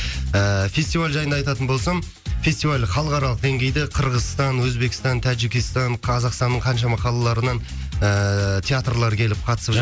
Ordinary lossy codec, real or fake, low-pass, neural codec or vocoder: none; real; none; none